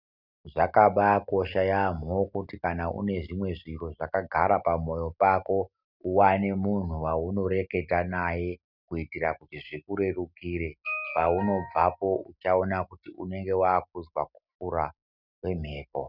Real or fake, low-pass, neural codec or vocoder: real; 5.4 kHz; none